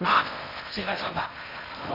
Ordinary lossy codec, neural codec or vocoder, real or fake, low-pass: AAC, 48 kbps; codec, 16 kHz in and 24 kHz out, 0.6 kbps, FocalCodec, streaming, 4096 codes; fake; 5.4 kHz